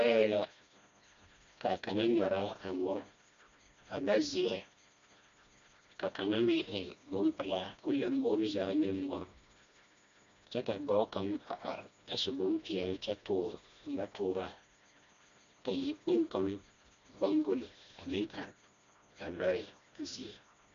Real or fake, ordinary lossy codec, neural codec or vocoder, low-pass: fake; MP3, 64 kbps; codec, 16 kHz, 1 kbps, FreqCodec, smaller model; 7.2 kHz